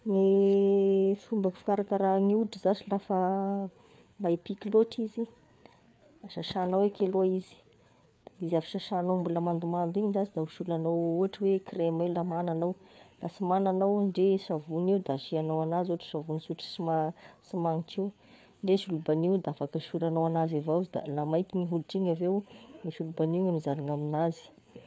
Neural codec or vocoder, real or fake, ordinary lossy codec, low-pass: codec, 16 kHz, 4 kbps, FreqCodec, larger model; fake; none; none